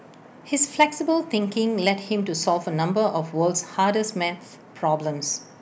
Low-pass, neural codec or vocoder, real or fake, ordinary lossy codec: none; none; real; none